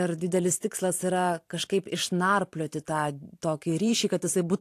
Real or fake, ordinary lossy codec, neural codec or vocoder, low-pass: fake; AAC, 64 kbps; vocoder, 44.1 kHz, 128 mel bands every 512 samples, BigVGAN v2; 14.4 kHz